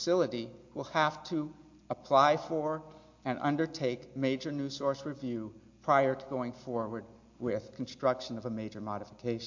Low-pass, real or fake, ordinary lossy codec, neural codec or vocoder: 7.2 kHz; real; MP3, 48 kbps; none